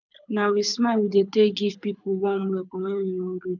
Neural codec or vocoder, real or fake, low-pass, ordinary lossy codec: codec, 24 kHz, 6 kbps, HILCodec; fake; 7.2 kHz; none